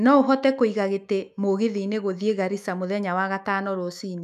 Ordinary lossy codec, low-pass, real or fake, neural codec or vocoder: none; 14.4 kHz; fake; autoencoder, 48 kHz, 128 numbers a frame, DAC-VAE, trained on Japanese speech